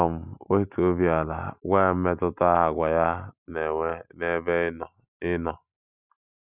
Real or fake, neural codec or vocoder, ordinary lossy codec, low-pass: real; none; none; 3.6 kHz